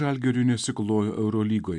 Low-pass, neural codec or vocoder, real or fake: 10.8 kHz; none; real